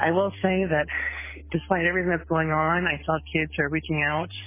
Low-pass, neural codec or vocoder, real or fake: 3.6 kHz; none; real